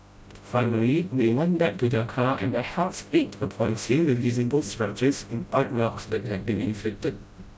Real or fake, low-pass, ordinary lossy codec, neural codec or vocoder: fake; none; none; codec, 16 kHz, 0.5 kbps, FreqCodec, smaller model